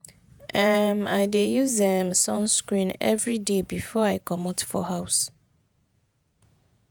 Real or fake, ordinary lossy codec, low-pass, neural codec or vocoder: fake; none; none; vocoder, 48 kHz, 128 mel bands, Vocos